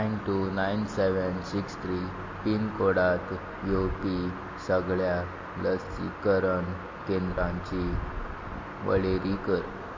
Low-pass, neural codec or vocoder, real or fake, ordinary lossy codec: 7.2 kHz; none; real; MP3, 32 kbps